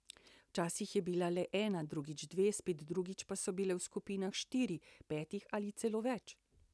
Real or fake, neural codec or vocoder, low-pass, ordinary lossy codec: real; none; none; none